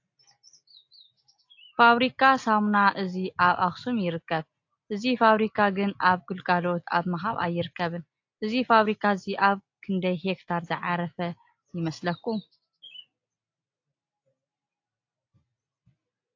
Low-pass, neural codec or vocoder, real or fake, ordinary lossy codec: 7.2 kHz; none; real; AAC, 48 kbps